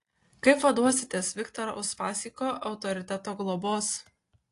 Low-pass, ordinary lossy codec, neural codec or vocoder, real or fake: 10.8 kHz; AAC, 48 kbps; none; real